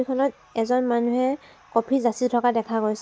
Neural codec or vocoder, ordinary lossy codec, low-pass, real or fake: none; none; none; real